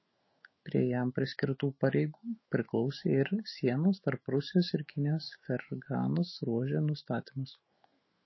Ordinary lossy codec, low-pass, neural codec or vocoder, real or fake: MP3, 24 kbps; 7.2 kHz; autoencoder, 48 kHz, 128 numbers a frame, DAC-VAE, trained on Japanese speech; fake